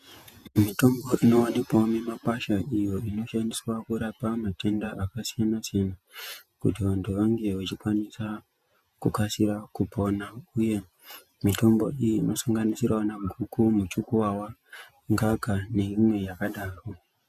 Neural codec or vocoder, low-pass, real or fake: vocoder, 48 kHz, 128 mel bands, Vocos; 14.4 kHz; fake